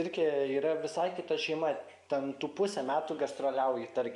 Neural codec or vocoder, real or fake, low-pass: none; real; 10.8 kHz